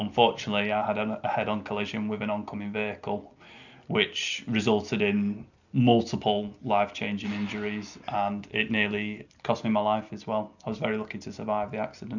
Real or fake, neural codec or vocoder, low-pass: real; none; 7.2 kHz